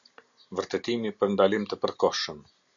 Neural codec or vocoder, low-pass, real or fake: none; 7.2 kHz; real